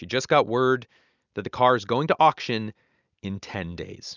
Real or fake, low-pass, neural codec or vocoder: real; 7.2 kHz; none